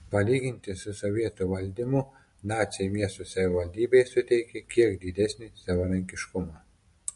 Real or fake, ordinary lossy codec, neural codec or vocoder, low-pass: real; MP3, 48 kbps; none; 14.4 kHz